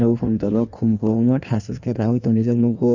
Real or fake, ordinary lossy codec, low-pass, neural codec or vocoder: fake; none; 7.2 kHz; codec, 16 kHz in and 24 kHz out, 1.1 kbps, FireRedTTS-2 codec